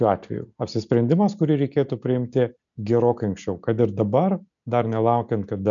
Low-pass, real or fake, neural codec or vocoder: 7.2 kHz; real; none